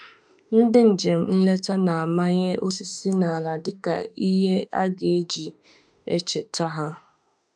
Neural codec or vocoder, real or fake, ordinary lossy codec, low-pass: autoencoder, 48 kHz, 32 numbers a frame, DAC-VAE, trained on Japanese speech; fake; none; 9.9 kHz